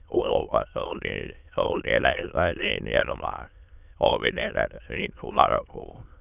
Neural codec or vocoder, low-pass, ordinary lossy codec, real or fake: autoencoder, 22.05 kHz, a latent of 192 numbers a frame, VITS, trained on many speakers; 3.6 kHz; none; fake